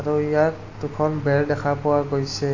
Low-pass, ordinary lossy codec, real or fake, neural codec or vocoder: 7.2 kHz; AAC, 48 kbps; real; none